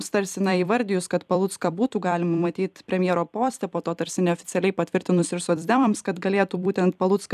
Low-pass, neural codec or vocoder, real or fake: 14.4 kHz; vocoder, 44.1 kHz, 128 mel bands every 256 samples, BigVGAN v2; fake